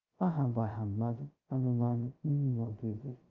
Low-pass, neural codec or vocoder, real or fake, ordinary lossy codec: 7.2 kHz; codec, 16 kHz, 0.2 kbps, FocalCodec; fake; Opus, 32 kbps